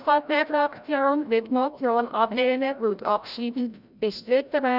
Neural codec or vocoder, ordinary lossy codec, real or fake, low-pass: codec, 16 kHz, 0.5 kbps, FreqCodec, larger model; none; fake; 5.4 kHz